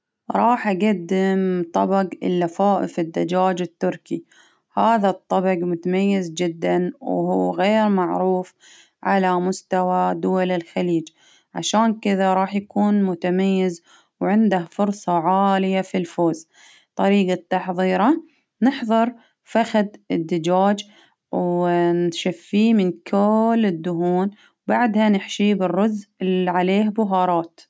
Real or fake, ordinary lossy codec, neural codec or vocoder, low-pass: real; none; none; none